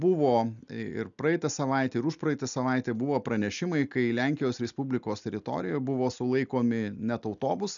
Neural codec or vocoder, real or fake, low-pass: none; real; 7.2 kHz